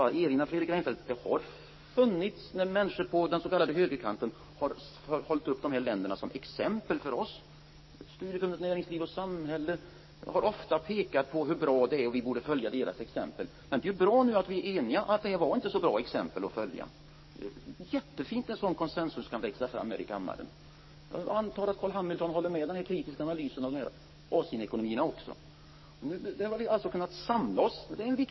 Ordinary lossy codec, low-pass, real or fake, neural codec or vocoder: MP3, 24 kbps; 7.2 kHz; fake; codec, 44.1 kHz, 7.8 kbps, DAC